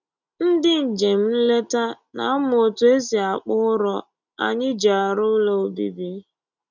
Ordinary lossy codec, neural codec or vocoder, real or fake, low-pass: none; none; real; 7.2 kHz